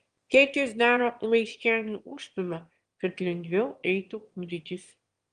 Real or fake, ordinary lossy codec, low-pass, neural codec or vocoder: fake; Opus, 24 kbps; 9.9 kHz; autoencoder, 22.05 kHz, a latent of 192 numbers a frame, VITS, trained on one speaker